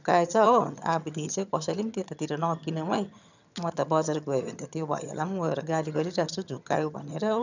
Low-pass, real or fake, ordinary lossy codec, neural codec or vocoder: 7.2 kHz; fake; none; vocoder, 22.05 kHz, 80 mel bands, HiFi-GAN